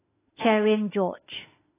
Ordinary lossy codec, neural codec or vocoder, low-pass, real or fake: AAC, 16 kbps; autoencoder, 48 kHz, 32 numbers a frame, DAC-VAE, trained on Japanese speech; 3.6 kHz; fake